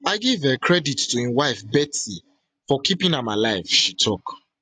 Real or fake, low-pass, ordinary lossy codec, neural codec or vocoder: real; 9.9 kHz; AAC, 64 kbps; none